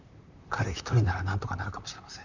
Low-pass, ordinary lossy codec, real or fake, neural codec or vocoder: 7.2 kHz; none; fake; vocoder, 44.1 kHz, 128 mel bands, Pupu-Vocoder